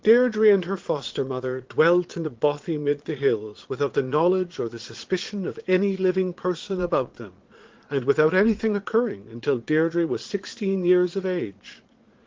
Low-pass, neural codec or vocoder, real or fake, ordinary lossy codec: 7.2 kHz; vocoder, 22.05 kHz, 80 mel bands, Vocos; fake; Opus, 16 kbps